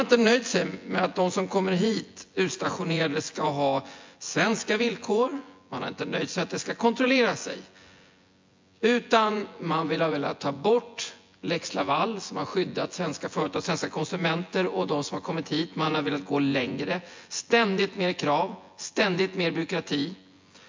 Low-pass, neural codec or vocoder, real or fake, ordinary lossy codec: 7.2 kHz; vocoder, 24 kHz, 100 mel bands, Vocos; fake; MP3, 48 kbps